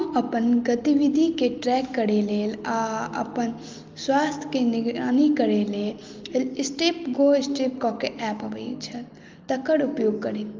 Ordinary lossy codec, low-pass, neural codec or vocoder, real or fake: Opus, 24 kbps; 7.2 kHz; none; real